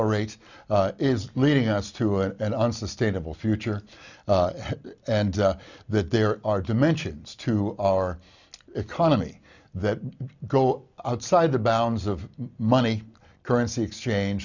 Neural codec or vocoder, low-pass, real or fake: none; 7.2 kHz; real